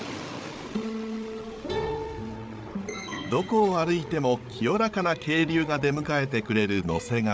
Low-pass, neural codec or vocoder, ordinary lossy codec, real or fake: none; codec, 16 kHz, 8 kbps, FreqCodec, larger model; none; fake